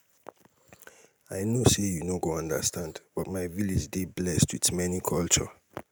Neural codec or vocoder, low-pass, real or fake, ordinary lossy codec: none; none; real; none